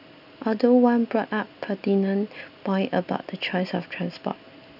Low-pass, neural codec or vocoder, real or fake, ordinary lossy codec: 5.4 kHz; none; real; none